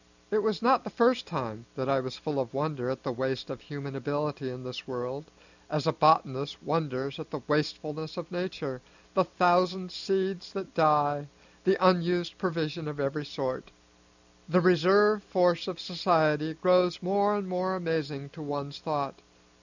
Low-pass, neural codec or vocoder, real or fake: 7.2 kHz; none; real